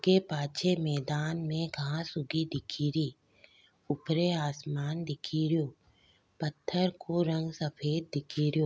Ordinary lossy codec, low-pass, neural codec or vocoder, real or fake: none; none; none; real